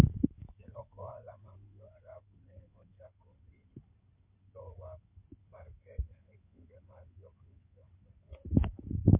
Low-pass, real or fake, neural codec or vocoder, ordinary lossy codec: 3.6 kHz; fake; codec, 16 kHz in and 24 kHz out, 2.2 kbps, FireRedTTS-2 codec; MP3, 24 kbps